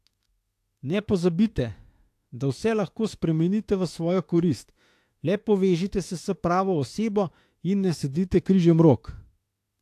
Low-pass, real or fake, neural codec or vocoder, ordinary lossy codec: 14.4 kHz; fake; autoencoder, 48 kHz, 32 numbers a frame, DAC-VAE, trained on Japanese speech; AAC, 64 kbps